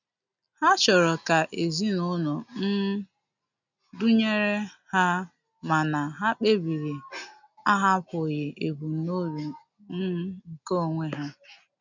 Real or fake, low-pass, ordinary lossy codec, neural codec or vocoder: real; 7.2 kHz; none; none